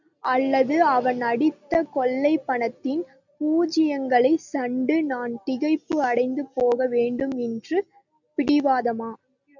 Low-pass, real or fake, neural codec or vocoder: 7.2 kHz; real; none